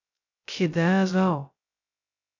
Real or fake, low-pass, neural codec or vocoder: fake; 7.2 kHz; codec, 16 kHz, 0.2 kbps, FocalCodec